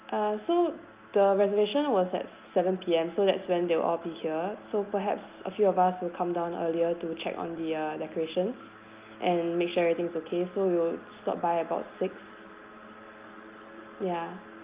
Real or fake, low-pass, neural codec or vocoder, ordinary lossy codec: real; 3.6 kHz; none; Opus, 24 kbps